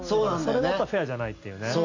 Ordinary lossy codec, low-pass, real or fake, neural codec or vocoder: AAC, 48 kbps; 7.2 kHz; real; none